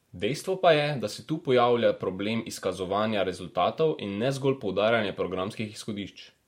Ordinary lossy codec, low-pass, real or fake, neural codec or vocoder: MP3, 64 kbps; 19.8 kHz; fake; vocoder, 44.1 kHz, 128 mel bands every 512 samples, BigVGAN v2